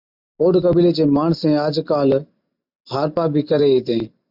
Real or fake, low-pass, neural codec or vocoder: real; 5.4 kHz; none